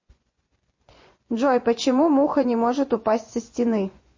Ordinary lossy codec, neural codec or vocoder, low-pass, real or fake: MP3, 32 kbps; vocoder, 24 kHz, 100 mel bands, Vocos; 7.2 kHz; fake